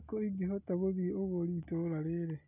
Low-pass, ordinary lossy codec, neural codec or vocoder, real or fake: 3.6 kHz; none; none; real